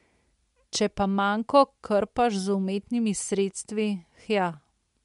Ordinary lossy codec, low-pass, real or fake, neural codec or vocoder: MP3, 64 kbps; 10.8 kHz; real; none